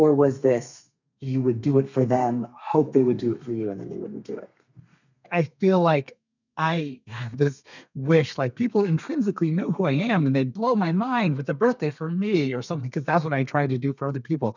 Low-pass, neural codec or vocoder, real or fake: 7.2 kHz; codec, 32 kHz, 1.9 kbps, SNAC; fake